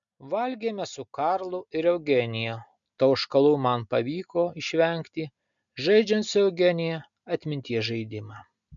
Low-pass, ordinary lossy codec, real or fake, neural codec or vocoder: 7.2 kHz; MP3, 96 kbps; real; none